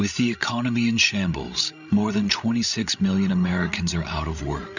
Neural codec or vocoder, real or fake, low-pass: codec, 16 kHz, 16 kbps, FreqCodec, larger model; fake; 7.2 kHz